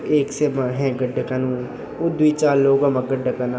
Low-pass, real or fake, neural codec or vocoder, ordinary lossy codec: none; real; none; none